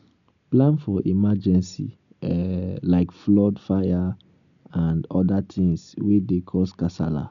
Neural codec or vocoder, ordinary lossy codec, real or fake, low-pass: none; none; real; 7.2 kHz